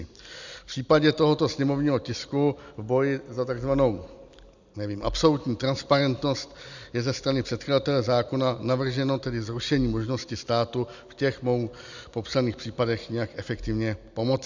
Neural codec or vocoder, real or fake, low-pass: none; real; 7.2 kHz